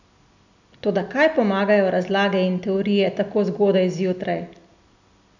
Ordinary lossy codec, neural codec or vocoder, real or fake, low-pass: none; none; real; 7.2 kHz